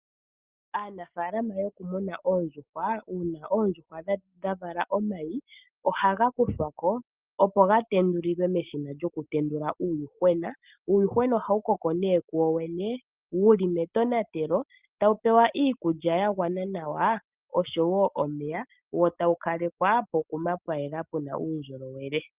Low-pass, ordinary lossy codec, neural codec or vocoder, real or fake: 3.6 kHz; Opus, 24 kbps; none; real